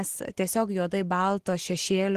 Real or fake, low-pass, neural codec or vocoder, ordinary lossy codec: real; 14.4 kHz; none; Opus, 16 kbps